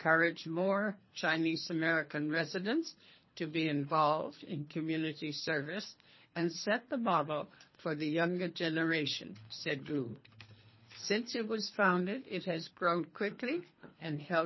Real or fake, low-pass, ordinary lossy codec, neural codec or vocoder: fake; 7.2 kHz; MP3, 24 kbps; codec, 24 kHz, 3 kbps, HILCodec